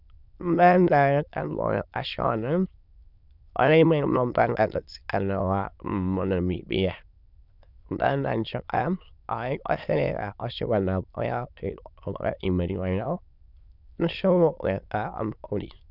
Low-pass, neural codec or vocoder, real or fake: 5.4 kHz; autoencoder, 22.05 kHz, a latent of 192 numbers a frame, VITS, trained on many speakers; fake